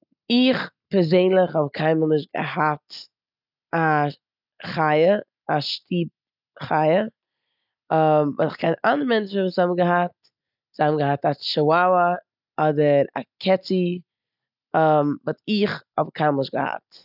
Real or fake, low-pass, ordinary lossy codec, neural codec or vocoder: real; 5.4 kHz; none; none